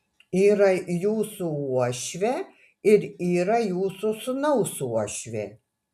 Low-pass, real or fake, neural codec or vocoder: 14.4 kHz; real; none